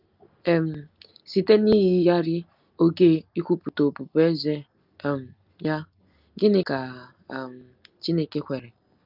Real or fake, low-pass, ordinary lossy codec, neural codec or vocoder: real; 5.4 kHz; Opus, 24 kbps; none